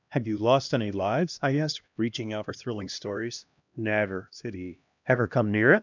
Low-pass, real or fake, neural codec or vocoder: 7.2 kHz; fake; codec, 16 kHz, 1 kbps, X-Codec, HuBERT features, trained on LibriSpeech